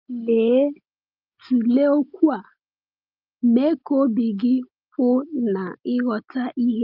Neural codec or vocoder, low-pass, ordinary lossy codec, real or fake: none; 5.4 kHz; Opus, 24 kbps; real